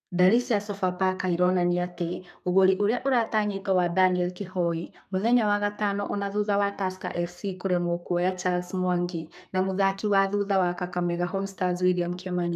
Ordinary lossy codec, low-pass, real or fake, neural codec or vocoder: none; 14.4 kHz; fake; codec, 32 kHz, 1.9 kbps, SNAC